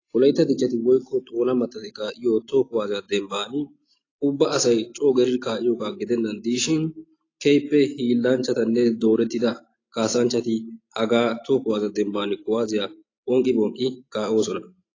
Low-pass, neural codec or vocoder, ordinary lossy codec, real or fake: 7.2 kHz; none; AAC, 32 kbps; real